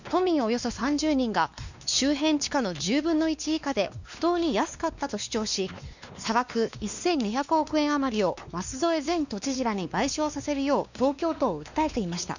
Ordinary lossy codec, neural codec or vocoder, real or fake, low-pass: none; codec, 16 kHz, 2 kbps, X-Codec, WavLM features, trained on Multilingual LibriSpeech; fake; 7.2 kHz